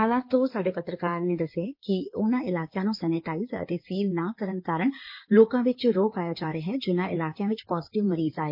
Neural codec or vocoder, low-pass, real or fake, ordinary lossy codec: codec, 16 kHz in and 24 kHz out, 2.2 kbps, FireRedTTS-2 codec; 5.4 kHz; fake; MP3, 32 kbps